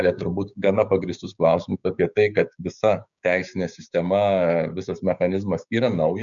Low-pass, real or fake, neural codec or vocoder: 7.2 kHz; fake; codec, 16 kHz, 6 kbps, DAC